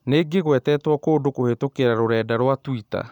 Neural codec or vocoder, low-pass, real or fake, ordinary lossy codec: none; 19.8 kHz; real; none